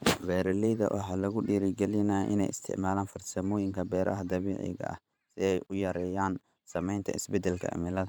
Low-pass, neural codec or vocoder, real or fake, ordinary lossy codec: none; vocoder, 44.1 kHz, 128 mel bands every 512 samples, BigVGAN v2; fake; none